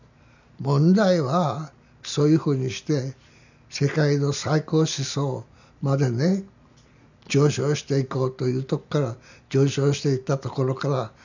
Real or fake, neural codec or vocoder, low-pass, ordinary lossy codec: real; none; 7.2 kHz; none